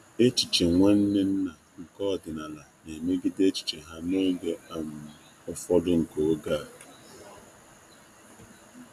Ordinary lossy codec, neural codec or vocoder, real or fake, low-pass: none; none; real; 14.4 kHz